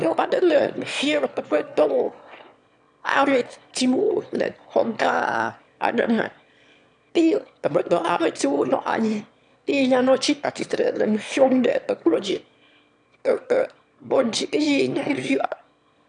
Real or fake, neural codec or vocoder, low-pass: fake; autoencoder, 22.05 kHz, a latent of 192 numbers a frame, VITS, trained on one speaker; 9.9 kHz